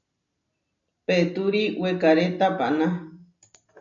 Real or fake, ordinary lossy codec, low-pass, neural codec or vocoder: real; MP3, 96 kbps; 7.2 kHz; none